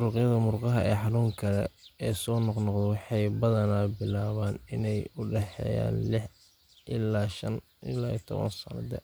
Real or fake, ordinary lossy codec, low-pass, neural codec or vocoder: real; none; none; none